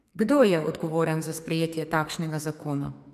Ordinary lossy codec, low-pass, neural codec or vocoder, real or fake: MP3, 96 kbps; 14.4 kHz; codec, 44.1 kHz, 2.6 kbps, SNAC; fake